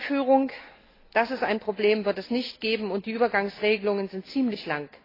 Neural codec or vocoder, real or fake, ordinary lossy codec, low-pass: none; real; AAC, 24 kbps; 5.4 kHz